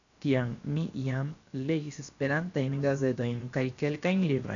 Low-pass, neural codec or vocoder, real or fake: 7.2 kHz; codec, 16 kHz, 0.7 kbps, FocalCodec; fake